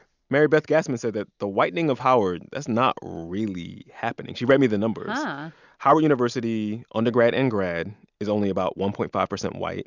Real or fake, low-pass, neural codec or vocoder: real; 7.2 kHz; none